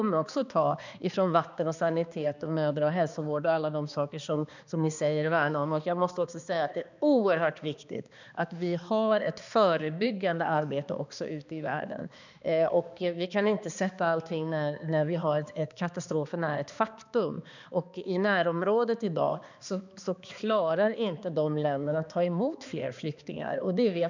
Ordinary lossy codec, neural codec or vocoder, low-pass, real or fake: none; codec, 16 kHz, 2 kbps, X-Codec, HuBERT features, trained on balanced general audio; 7.2 kHz; fake